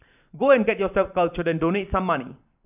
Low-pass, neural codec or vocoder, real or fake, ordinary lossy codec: 3.6 kHz; vocoder, 44.1 kHz, 128 mel bands every 512 samples, BigVGAN v2; fake; none